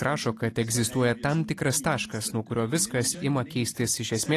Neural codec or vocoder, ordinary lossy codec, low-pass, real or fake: none; AAC, 48 kbps; 14.4 kHz; real